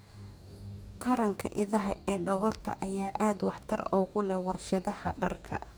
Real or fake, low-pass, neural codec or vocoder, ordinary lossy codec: fake; none; codec, 44.1 kHz, 2.6 kbps, DAC; none